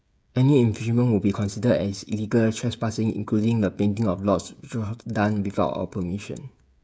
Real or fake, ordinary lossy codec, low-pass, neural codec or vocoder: fake; none; none; codec, 16 kHz, 16 kbps, FreqCodec, smaller model